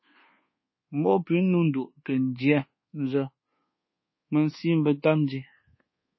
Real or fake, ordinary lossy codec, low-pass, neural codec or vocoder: fake; MP3, 24 kbps; 7.2 kHz; codec, 24 kHz, 1.2 kbps, DualCodec